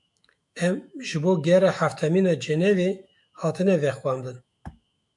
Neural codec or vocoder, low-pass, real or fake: autoencoder, 48 kHz, 128 numbers a frame, DAC-VAE, trained on Japanese speech; 10.8 kHz; fake